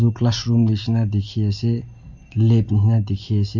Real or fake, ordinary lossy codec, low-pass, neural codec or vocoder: real; MP3, 48 kbps; 7.2 kHz; none